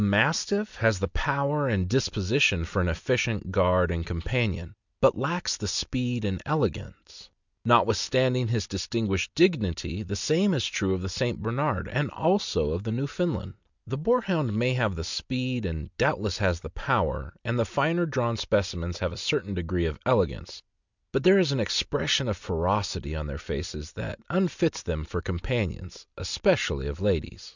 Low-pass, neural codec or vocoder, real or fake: 7.2 kHz; none; real